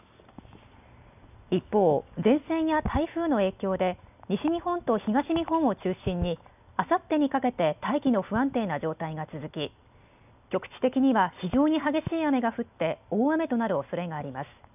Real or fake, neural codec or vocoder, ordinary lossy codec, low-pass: real; none; none; 3.6 kHz